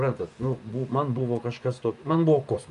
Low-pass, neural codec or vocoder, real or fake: 10.8 kHz; vocoder, 24 kHz, 100 mel bands, Vocos; fake